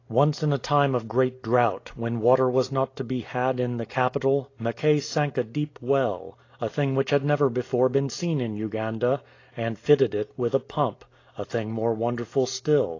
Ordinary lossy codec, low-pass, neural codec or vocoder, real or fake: AAC, 32 kbps; 7.2 kHz; none; real